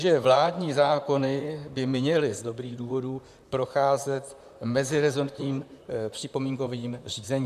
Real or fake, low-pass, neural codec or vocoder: fake; 14.4 kHz; vocoder, 44.1 kHz, 128 mel bands, Pupu-Vocoder